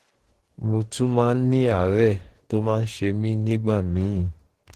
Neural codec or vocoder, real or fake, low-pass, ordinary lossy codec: codec, 44.1 kHz, 2.6 kbps, DAC; fake; 14.4 kHz; Opus, 16 kbps